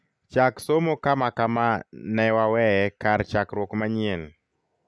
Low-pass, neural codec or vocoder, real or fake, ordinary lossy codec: none; none; real; none